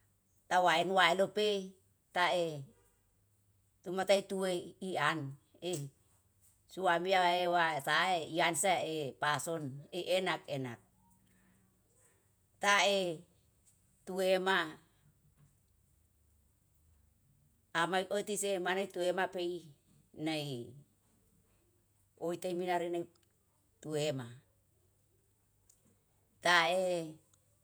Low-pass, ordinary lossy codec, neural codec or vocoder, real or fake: none; none; vocoder, 48 kHz, 128 mel bands, Vocos; fake